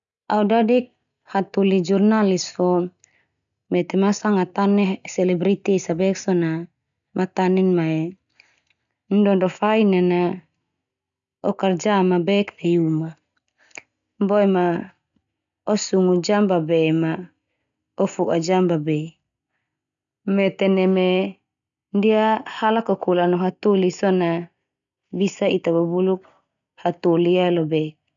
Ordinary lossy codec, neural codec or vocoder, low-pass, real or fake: none; none; 7.2 kHz; real